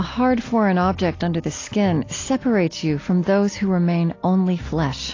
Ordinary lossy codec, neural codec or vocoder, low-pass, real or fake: AAC, 32 kbps; none; 7.2 kHz; real